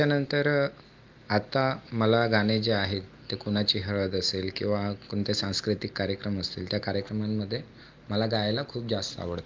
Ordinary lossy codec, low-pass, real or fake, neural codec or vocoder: Opus, 24 kbps; 7.2 kHz; real; none